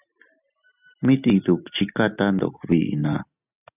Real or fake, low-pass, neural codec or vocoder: real; 3.6 kHz; none